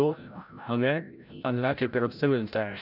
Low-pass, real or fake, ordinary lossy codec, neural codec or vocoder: 5.4 kHz; fake; none; codec, 16 kHz, 0.5 kbps, FreqCodec, larger model